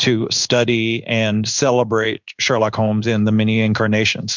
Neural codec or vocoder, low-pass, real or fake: codec, 16 kHz in and 24 kHz out, 1 kbps, XY-Tokenizer; 7.2 kHz; fake